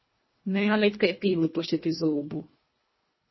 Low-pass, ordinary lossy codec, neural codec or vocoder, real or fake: 7.2 kHz; MP3, 24 kbps; codec, 24 kHz, 1.5 kbps, HILCodec; fake